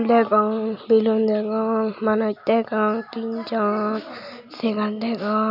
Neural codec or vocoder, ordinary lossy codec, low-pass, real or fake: none; none; 5.4 kHz; real